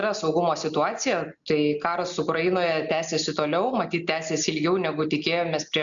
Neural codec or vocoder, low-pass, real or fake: none; 7.2 kHz; real